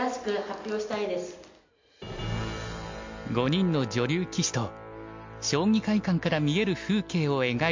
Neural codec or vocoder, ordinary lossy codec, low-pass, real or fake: none; MP3, 48 kbps; 7.2 kHz; real